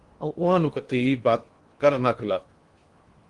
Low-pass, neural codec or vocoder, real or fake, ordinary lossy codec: 10.8 kHz; codec, 16 kHz in and 24 kHz out, 0.8 kbps, FocalCodec, streaming, 65536 codes; fake; Opus, 24 kbps